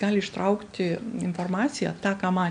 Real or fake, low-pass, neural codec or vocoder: real; 9.9 kHz; none